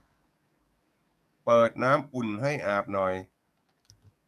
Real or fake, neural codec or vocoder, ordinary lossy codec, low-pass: fake; codec, 44.1 kHz, 7.8 kbps, DAC; none; 14.4 kHz